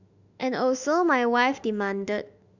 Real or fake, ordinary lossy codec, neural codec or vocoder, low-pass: fake; none; autoencoder, 48 kHz, 32 numbers a frame, DAC-VAE, trained on Japanese speech; 7.2 kHz